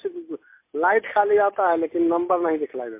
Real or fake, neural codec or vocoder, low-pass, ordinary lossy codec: real; none; 3.6 kHz; MP3, 24 kbps